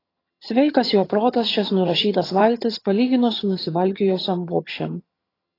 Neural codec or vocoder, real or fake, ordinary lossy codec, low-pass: vocoder, 22.05 kHz, 80 mel bands, HiFi-GAN; fake; AAC, 24 kbps; 5.4 kHz